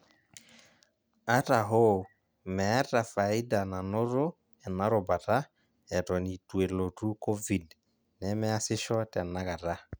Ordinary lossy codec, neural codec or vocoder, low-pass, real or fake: none; none; none; real